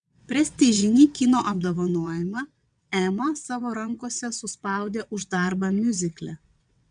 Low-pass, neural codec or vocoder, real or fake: 9.9 kHz; vocoder, 22.05 kHz, 80 mel bands, WaveNeXt; fake